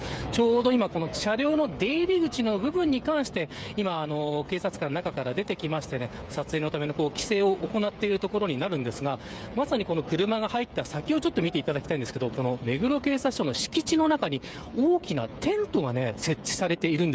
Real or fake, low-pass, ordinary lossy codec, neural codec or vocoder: fake; none; none; codec, 16 kHz, 8 kbps, FreqCodec, smaller model